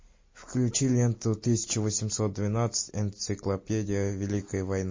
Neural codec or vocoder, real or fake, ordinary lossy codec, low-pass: none; real; MP3, 32 kbps; 7.2 kHz